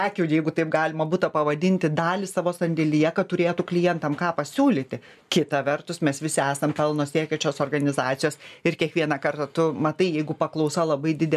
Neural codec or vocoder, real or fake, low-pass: none; real; 14.4 kHz